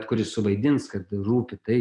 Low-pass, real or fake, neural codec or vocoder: 10.8 kHz; real; none